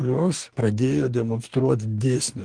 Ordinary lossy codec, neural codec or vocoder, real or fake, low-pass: Opus, 24 kbps; codec, 44.1 kHz, 2.6 kbps, DAC; fake; 9.9 kHz